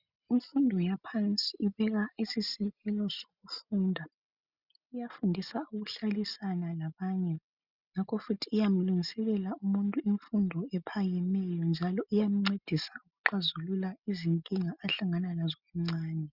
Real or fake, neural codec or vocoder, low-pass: real; none; 5.4 kHz